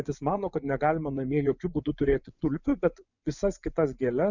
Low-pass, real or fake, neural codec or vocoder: 7.2 kHz; fake; vocoder, 24 kHz, 100 mel bands, Vocos